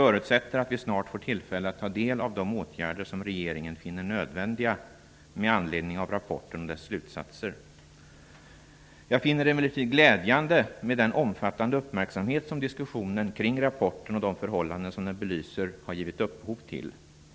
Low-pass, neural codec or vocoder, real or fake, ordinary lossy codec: none; none; real; none